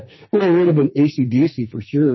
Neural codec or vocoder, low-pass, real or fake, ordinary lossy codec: codec, 44.1 kHz, 2.6 kbps, SNAC; 7.2 kHz; fake; MP3, 24 kbps